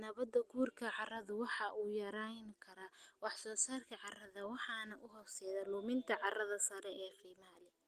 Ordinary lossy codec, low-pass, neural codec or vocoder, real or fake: Opus, 24 kbps; 14.4 kHz; none; real